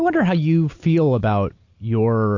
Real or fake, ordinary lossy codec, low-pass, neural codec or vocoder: real; AAC, 48 kbps; 7.2 kHz; none